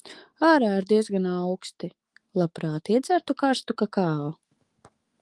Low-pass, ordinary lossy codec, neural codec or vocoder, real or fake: 10.8 kHz; Opus, 24 kbps; autoencoder, 48 kHz, 128 numbers a frame, DAC-VAE, trained on Japanese speech; fake